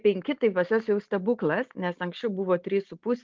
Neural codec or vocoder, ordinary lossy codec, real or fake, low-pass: none; Opus, 32 kbps; real; 7.2 kHz